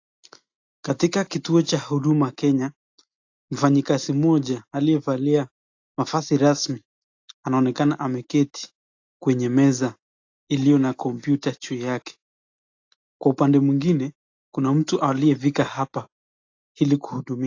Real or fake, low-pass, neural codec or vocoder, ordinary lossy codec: real; 7.2 kHz; none; AAC, 48 kbps